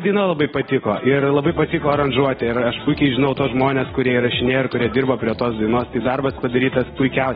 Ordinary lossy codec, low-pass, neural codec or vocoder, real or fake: AAC, 16 kbps; 7.2 kHz; none; real